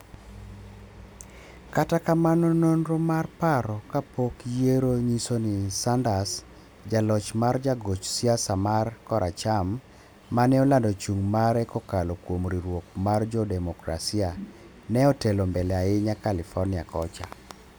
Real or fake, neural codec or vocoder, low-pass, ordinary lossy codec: real; none; none; none